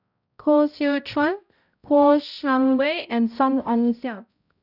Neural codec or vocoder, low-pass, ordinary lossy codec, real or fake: codec, 16 kHz, 0.5 kbps, X-Codec, HuBERT features, trained on balanced general audio; 5.4 kHz; none; fake